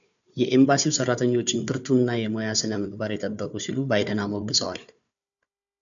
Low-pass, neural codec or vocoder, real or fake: 7.2 kHz; codec, 16 kHz, 4 kbps, FunCodec, trained on Chinese and English, 50 frames a second; fake